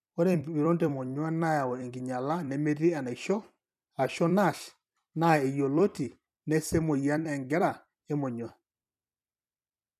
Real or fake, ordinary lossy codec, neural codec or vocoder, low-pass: fake; none; vocoder, 44.1 kHz, 128 mel bands every 256 samples, BigVGAN v2; 14.4 kHz